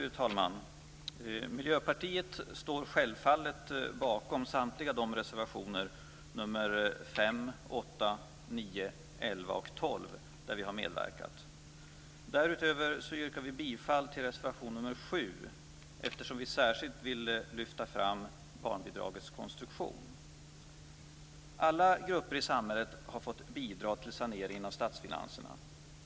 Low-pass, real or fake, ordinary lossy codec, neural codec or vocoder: none; real; none; none